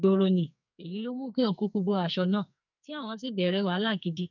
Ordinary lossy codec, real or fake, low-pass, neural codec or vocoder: none; fake; 7.2 kHz; codec, 44.1 kHz, 2.6 kbps, SNAC